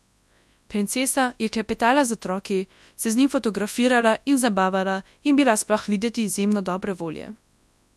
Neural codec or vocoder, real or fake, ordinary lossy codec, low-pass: codec, 24 kHz, 0.9 kbps, WavTokenizer, large speech release; fake; none; none